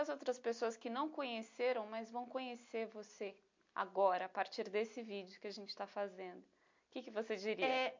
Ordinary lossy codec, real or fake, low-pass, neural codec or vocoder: MP3, 48 kbps; real; 7.2 kHz; none